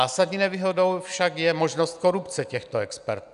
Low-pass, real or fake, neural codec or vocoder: 10.8 kHz; real; none